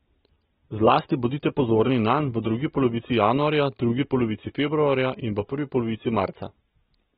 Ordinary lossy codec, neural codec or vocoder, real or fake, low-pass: AAC, 16 kbps; none; real; 7.2 kHz